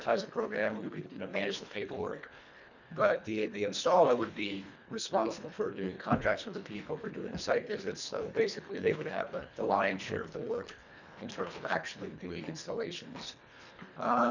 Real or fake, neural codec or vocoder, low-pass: fake; codec, 24 kHz, 1.5 kbps, HILCodec; 7.2 kHz